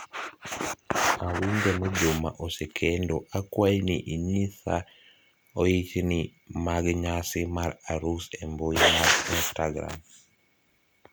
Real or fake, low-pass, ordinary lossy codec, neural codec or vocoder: real; none; none; none